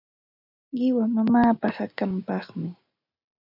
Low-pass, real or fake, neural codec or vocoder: 5.4 kHz; real; none